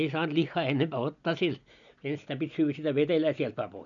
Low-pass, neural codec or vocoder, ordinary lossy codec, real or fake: 7.2 kHz; none; none; real